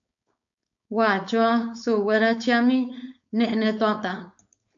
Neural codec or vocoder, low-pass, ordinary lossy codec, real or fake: codec, 16 kHz, 4.8 kbps, FACodec; 7.2 kHz; AAC, 64 kbps; fake